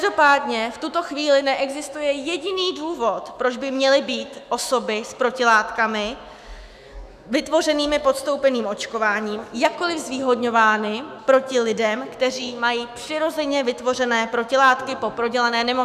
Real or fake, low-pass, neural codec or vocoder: fake; 14.4 kHz; autoencoder, 48 kHz, 128 numbers a frame, DAC-VAE, trained on Japanese speech